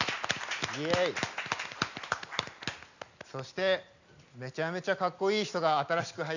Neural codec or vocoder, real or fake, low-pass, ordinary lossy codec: none; real; 7.2 kHz; none